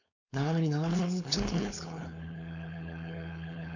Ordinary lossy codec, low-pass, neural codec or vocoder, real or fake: none; 7.2 kHz; codec, 16 kHz, 4.8 kbps, FACodec; fake